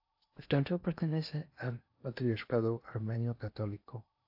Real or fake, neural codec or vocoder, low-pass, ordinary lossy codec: fake; codec, 16 kHz in and 24 kHz out, 0.8 kbps, FocalCodec, streaming, 65536 codes; 5.4 kHz; AAC, 48 kbps